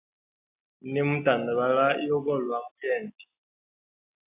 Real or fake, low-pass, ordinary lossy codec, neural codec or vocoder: real; 3.6 kHz; AAC, 24 kbps; none